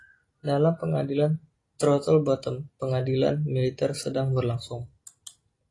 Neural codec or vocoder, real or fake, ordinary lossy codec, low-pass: none; real; AAC, 32 kbps; 10.8 kHz